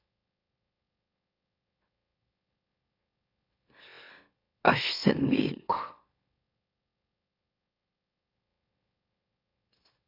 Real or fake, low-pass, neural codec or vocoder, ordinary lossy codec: fake; 5.4 kHz; autoencoder, 44.1 kHz, a latent of 192 numbers a frame, MeloTTS; AAC, 32 kbps